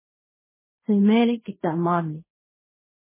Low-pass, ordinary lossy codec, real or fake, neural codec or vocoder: 3.6 kHz; MP3, 16 kbps; fake; codec, 16 kHz in and 24 kHz out, 0.4 kbps, LongCat-Audio-Codec, fine tuned four codebook decoder